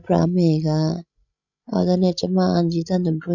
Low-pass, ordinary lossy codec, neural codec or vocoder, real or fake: 7.2 kHz; none; codec, 16 kHz, 16 kbps, FreqCodec, larger model; fake